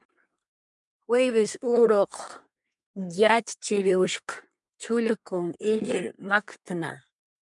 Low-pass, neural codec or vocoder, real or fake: 10.8 kHz; codec, 24 kHz, 1 kbps, SNAC; fake